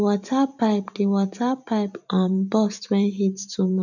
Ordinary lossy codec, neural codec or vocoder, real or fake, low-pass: none; vocoder, 44.1 kHz, 128 mel bands every 512 samples, BigVGAN v2; fake; 7.2 kHz